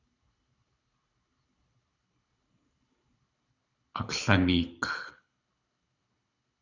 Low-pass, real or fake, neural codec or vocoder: 7.2 kHz; fake; codec, 24 kHz, 6 kbps, HILCodec